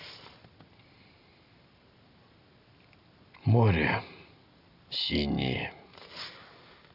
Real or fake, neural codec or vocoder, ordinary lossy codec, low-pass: real; none; Opus, 64 kbps; 5.4 kHz